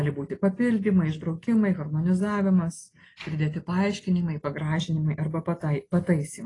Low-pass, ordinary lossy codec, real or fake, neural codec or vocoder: 10.8 kHz; AAC, 32 kbps; real; none